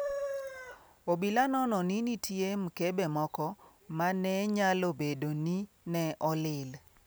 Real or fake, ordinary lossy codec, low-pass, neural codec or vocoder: real; none; none; none